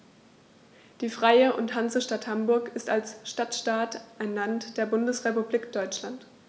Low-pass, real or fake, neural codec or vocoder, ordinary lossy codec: none; real; none; none